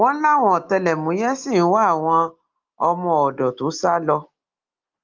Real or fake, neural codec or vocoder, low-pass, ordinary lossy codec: real; none; 7.2 kHz; Opus, 24 kbps